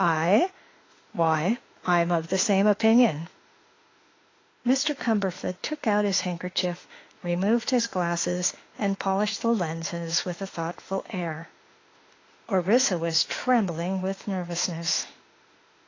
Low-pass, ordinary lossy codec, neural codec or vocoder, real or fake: 7.2 kHz; AAC, 32 kbps; autoencoder, 48 kHz, 32 numbers a frame, DAC-VAE, trained on Japanese speech; fake